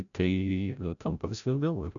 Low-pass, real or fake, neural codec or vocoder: 7.2 kHz; fake; codec, 16 kHz, 0.5 kbps, FreqCodec, larger model